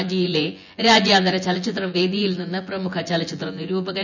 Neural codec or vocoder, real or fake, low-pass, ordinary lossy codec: vocoder, 24 kHz, 100 mel bands, Vocos; fake; 7.2 kHz; none